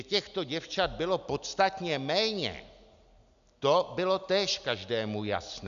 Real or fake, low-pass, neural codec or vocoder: real; 7.2 kHz; none